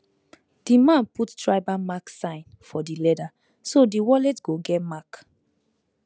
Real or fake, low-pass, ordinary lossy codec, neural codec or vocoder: real; none; none; none